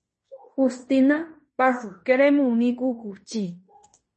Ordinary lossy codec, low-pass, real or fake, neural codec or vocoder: MP3, 32 kbps; 10.8 kHz; fake; codec, 16 kHz in and 24 kHz out, 0.9 kbps, LongCat-Audio-Codec, fine tuned four codebook decoder